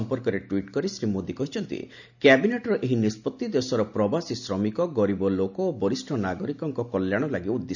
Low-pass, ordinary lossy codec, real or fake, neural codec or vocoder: 7.2 kHz; none; real; none